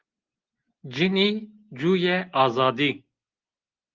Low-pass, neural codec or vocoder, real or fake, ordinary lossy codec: 7.2 kHz; none; real; Opus, 16 kbps